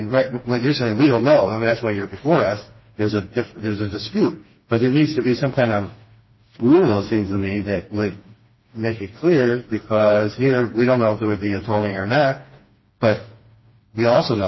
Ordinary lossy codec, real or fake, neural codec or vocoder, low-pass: MP3, 24 kbps; fake; codec, 16 kHz, 2 kbps, FreqCodec, smaller model; 7.2 kHz